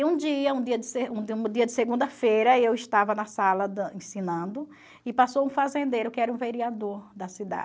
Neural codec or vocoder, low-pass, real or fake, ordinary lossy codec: none; none; real; none